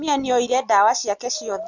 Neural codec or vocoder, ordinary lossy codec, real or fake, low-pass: none; none; real; 7.2 kHz